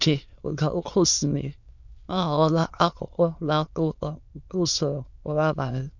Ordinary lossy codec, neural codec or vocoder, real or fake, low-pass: none; autoencoder, 22.05 kHz, a latent of 192 numbers a frame, VITS, trained on many speakers; fake; 7.2 kHz